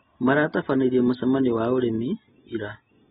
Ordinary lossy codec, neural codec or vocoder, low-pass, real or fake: AAC, 16 kbps; none; 19.8 kHz; real